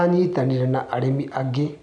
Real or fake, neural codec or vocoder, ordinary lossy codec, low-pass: real; none; none; 9.9 kHz